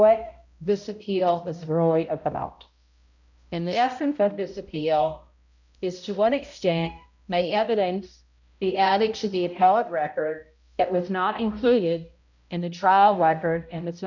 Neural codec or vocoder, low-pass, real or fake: codec, 16 kHz, 0.5 kbps, X-Codec, HuBERT features, trained on balanced general audio; 7.2 kHz; fake